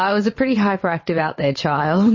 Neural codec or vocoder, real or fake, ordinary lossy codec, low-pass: vocoder, 22.05 kHz, 80 mel bands, WaveNeXt; fake; MP3, 32 kbps; 7.2 kHz